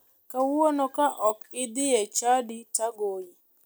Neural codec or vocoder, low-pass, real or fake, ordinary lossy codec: none; none; real; none